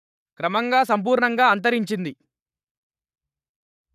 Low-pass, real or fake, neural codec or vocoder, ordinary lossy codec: 14.4 kHz; fake; codec, 44.1 kHz, 7.8 kbps, Pupu-Codec; none